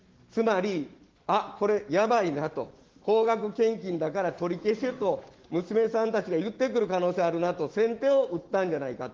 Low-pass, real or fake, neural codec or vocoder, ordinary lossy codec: 7.2 kHz; fake; autoencoder, 48 kHz, 128 numbers a frame, DAC-VAE, trained on Japanese speech; Opus, 16 kbps